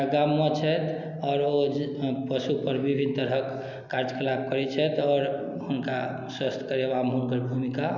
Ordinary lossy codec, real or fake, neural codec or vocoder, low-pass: none; real; none; 7.2 kHz